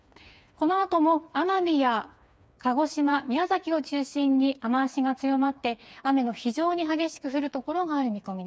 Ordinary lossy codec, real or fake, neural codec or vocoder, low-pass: none; fake; codec, 16 kHz, 4 kbps, FreqCodec, smaller model; none